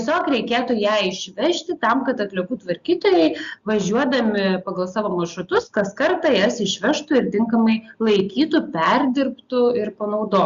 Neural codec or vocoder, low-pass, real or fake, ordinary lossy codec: none; 7.2 kHz; real; Opus, 64 kbps